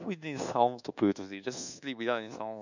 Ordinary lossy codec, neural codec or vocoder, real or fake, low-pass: none; codec, 24 kHz, 1.2 kbps, DualCodec; fake; 7.2 kHz